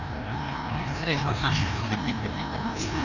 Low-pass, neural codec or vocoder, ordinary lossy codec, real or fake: 7.2 kHz; codec, 16 kHz, 1 kbps, FreqCodec, larger model; none; fake